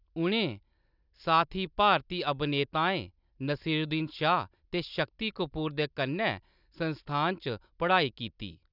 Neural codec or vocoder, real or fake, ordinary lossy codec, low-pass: none; real; none; 5.4 kHz